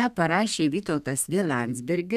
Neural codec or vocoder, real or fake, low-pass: codec, 32 kHz, 1.9 kbps, SNAC; fake; 14.4 kHz